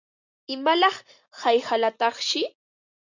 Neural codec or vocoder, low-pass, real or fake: none; 7.2 kHz; real